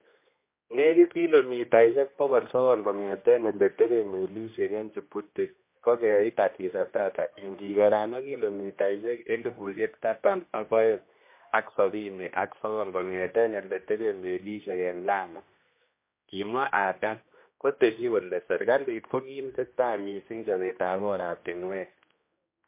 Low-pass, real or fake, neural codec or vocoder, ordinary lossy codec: 3.6 kHz; fake; codec, 16 kHz, 1 kbps, X-Codec, HuBERT features, trained on general audio; MP3, 24 kbps